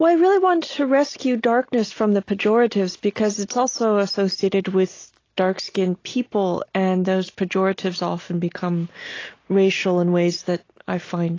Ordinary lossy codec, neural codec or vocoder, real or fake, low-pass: AAC, 32 kbps; none; real; 7.2 kHz